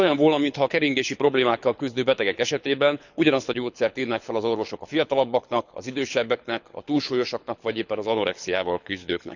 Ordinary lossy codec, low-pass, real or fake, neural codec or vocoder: none; 7.2 kHz; fake; codec, 24 kHz, 6 kbps, HILCodec